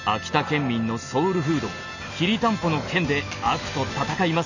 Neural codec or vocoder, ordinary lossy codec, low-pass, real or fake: none; none; 7.2 kHz; real